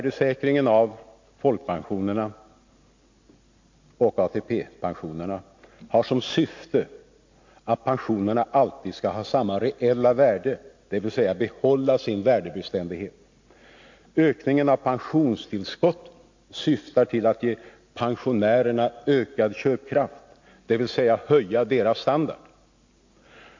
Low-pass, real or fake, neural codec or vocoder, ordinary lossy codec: 7.2 kHz; real; none; MP3, 48 kbps